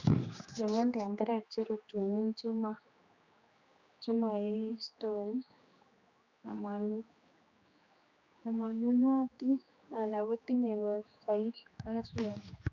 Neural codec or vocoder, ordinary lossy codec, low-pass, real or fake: codec, 16 kHz, 2 kbps, X-Codec, HuBERT features, trained on general audio; Opus, 64 kbps; 7.2 kHz; fake